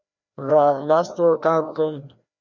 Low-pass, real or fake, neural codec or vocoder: 7.2 kHz; fake; codec, 16 kHz, 1 kbps, FreqCodec, larger model